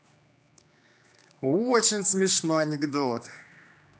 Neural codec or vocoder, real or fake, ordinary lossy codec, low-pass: codec, 16 kHz, 2 kbps, X-Codec, HuBERT features, trained on general audio; fake; none; none